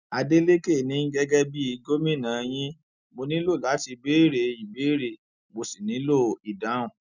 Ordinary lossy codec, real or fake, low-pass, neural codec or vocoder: none; real; 7.2 kHz; none